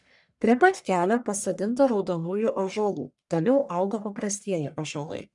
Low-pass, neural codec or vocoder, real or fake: 10.8 kHz; codec, 44.1 kHz, 1.7 kbps, Pupu-Codec; fake